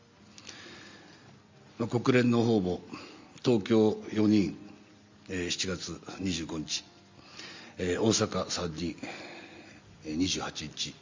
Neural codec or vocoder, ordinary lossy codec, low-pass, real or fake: none; MP3, 64 kbps; 7.2 kHz; real